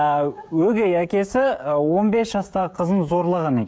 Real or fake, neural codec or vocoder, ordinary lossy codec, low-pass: fake; codec, 16 kHz, 16 kbps, FreqCodec, smaller model; none; none